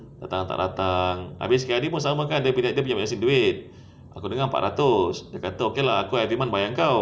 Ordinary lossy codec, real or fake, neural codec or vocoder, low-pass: none; real; none; none